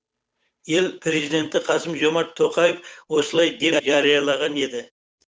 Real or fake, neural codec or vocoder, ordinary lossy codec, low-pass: fake; codec, 16 kHz, 8 kbps, FunCodec, trained on Chinese and English, 25 frames a second; none; none